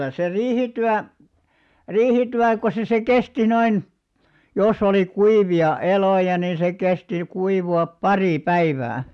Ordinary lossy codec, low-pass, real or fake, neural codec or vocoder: none; none; real; none